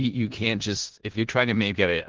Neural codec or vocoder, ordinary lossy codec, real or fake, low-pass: codec, 16 kHz in and 24 kHz out, 0.4 kbps, LongCat-Audio-Codec, four codebook decoder; Opus, 16 kbps; fake; 7.2 kHz